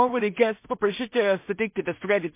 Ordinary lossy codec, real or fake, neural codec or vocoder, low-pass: MP3, 24 kbps; fake; codec, 16 kHz in and 24 kHz out, 0.4 kbps, LongCat-Audio-Codec, two codebook decoder; 3.6 kHz